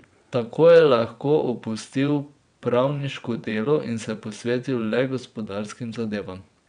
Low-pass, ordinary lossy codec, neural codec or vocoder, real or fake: 9.9 kHz; none; vocoder, 22.05 kHz, 80 mel bands, WaveNeXt; fake